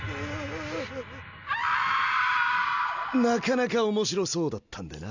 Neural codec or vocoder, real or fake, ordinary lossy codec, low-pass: none; real; none; 7.2 kHz